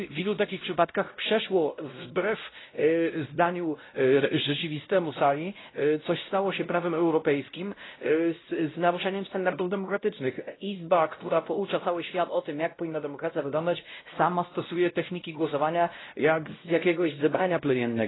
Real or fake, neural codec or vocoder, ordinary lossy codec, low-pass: fake; codec, 16 kHz, 0.5 kbps, X-Codec, WavLM features, trained on Multilingual LibriSpeech; AAC, 16 kbps; 7.2 kHz